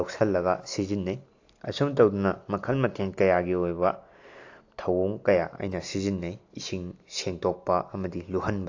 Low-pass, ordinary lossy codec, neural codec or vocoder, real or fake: 7.2 kHz; AAC, 48 kbps; none; real